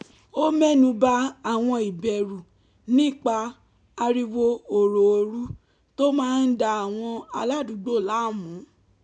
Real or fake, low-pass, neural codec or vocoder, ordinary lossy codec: real; 10.8 kHz; none; none